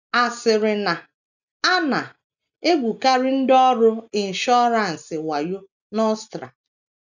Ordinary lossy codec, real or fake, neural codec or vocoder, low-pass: none; real; none; 7.2 kHz